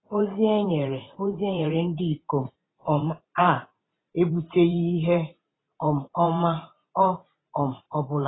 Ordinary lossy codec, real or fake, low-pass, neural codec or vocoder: AAC, 16 kbps; fake; 7.2 kHz; vocoder, 44.1 kHz, 128 mel bands every 512 samples, BigVGAN v2